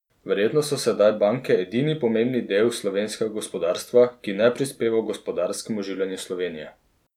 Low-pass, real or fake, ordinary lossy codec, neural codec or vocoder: 19.8 kHz; real; none; none